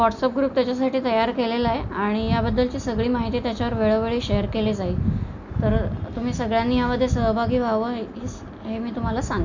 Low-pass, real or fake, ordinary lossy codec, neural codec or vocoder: 7.2 kHz; real; none; none